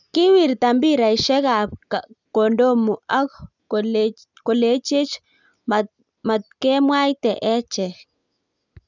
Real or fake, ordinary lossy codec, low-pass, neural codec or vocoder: real; none; 7.2 kHz; none